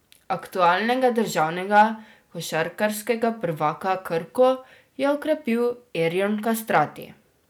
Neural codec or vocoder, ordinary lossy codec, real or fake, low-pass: none; none; real; none